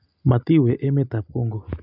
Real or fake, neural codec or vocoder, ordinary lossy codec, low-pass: real; none; none; 5.4 kHz